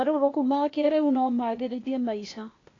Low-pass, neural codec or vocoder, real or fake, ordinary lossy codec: 7.2 kHz; codec, 16 kHz, 0.8 kbps, ZipCodec; fake; AAC, 32 kbps